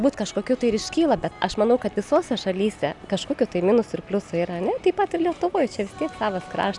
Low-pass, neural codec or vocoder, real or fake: 10.8 kHz; none; real